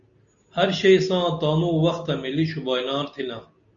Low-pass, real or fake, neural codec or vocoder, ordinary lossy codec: 7.2 kHz; real; none; Opus, 64 kbps